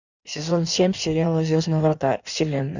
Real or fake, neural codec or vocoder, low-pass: fake; codec, 16 kHz in and 24 kHz out, 1.1 kbps, FireRedTTS-2 codec; 7.2 kHz